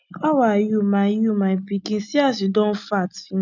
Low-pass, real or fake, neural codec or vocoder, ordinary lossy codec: 7.2 kHz; real; none; none